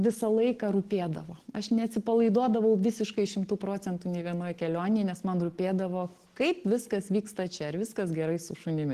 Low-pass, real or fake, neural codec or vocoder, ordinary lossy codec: 14.4 kHz; real; none; Opus, 16 kbps